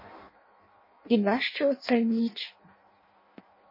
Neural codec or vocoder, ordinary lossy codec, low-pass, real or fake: codec, 16 kHz in and 24 kHz out, 0.6 kbps, FireRedTTS-2 codec; MP3, 24 kbps; 5.4 kHz; fake